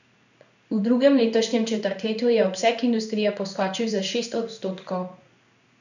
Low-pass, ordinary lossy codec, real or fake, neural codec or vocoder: 7.2 kHz; none; fake; codec, 16 kHz in and 24 kHz out, 1 kbps, XY-Tokenizer